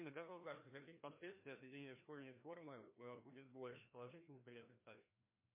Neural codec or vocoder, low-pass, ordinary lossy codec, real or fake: codec, 16 kHz, 1 kbps, FreqCodec, larger model; 3.6 kHz; MP3, 24 kbps; fake